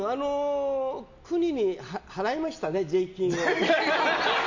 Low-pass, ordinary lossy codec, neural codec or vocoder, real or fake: 7.2 kHz; Opus, 64 kbps; none; real